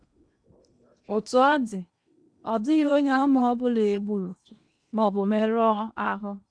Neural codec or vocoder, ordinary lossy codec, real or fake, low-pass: codec, 16 kHz in and 24 kHz out, 0.8 kbps, FocalCodec, streaming, 65536 codes; none; fake; 9.9 kHz